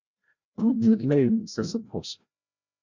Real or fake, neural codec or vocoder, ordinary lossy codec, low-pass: fake; codec, 16 kHz, 0.5 kbps, FreqCodec, larger model; Opus, 64 kbps; 7.2 kHz